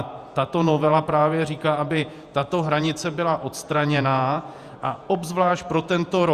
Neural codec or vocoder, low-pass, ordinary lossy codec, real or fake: vocoder, 48 kHz, 128 mel bands, Vocos; 14.4 kHz; Opus, 64 kbps; fake